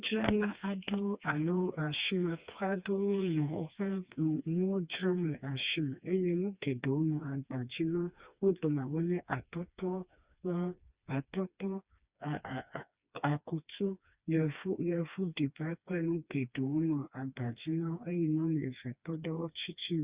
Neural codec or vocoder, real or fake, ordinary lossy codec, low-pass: codec, 16 kHz, 2 kbps, FreqCodec, smaller model; fake; Opus, 64 kbps; 3.6 kHz